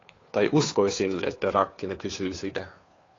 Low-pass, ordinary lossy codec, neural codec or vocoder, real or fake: 7.2 kHz; AAC, 32 kbps; codec, 16 kHz, 2 kbps, FunCodec, trained on Chinese and English, 25 frames a second; fake